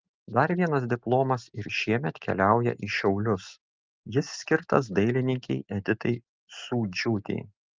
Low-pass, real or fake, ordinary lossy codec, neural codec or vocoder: 7.2 kHz; real; Opus, 32 kbps; none